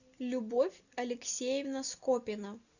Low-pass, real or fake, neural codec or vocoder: 7.2 kHz; real; none